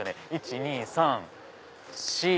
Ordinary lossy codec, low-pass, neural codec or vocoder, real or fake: none; none; none; real